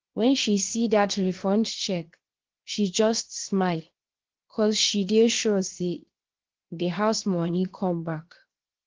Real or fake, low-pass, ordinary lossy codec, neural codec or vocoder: fake; 7.2 kHz; Opus, 16 kbps; codec, 16 kHz, 0.7 kbps, FocalCodec